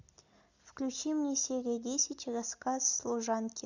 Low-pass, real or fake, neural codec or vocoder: 7.2 kHz; real; none